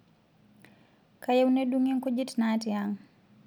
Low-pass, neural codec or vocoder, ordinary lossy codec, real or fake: none; none; none; real